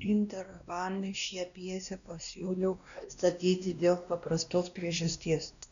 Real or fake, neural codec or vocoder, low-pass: fake; codec, 16 kHz, 1 kbps, X-Codec, WavLM features, trained on Multilingual LibriSpeech; 7.2 kHz